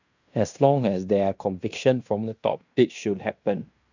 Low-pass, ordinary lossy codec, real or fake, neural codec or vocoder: 7.2 kHz; none; fake; codec, 16 kHz in and 24 kHz out, 0.9 kbps, LongCat-Audio-Codec, fine tuned four codebook decoder